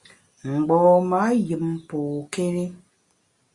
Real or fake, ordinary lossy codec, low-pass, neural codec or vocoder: real; Opus, 64 kbps; 10.8 kHz; none